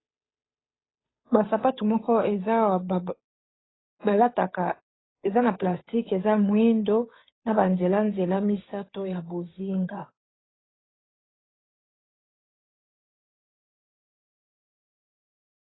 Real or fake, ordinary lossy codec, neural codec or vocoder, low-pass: fake; AAC, 16 kbps; codec, 16 kHz, 8 kbps, FunCodec, trained on Chinese and English, 25 frames a second; 7.2 kHz